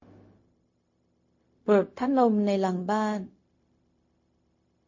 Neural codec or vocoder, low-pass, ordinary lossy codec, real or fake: codec, 16 kHz, 0.4 kbps, LongCat-Audio-Codec; 7.2 kHz; MP3, 32 kbps; fake